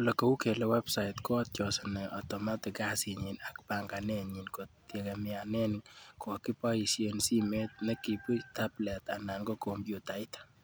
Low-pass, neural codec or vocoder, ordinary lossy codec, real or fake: none; none; none; real